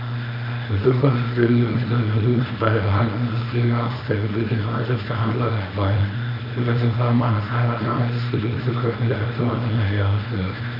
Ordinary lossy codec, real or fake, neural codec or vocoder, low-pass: none; fake; codec, 24 kHz, 0.9 kbps, WavTokenizer, small release; 5.4 kHz